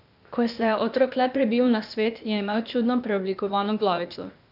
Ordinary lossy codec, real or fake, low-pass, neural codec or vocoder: none; fake; 5.4 kHz; codec, 16 kHz, 0.8 kbps, ZipCodec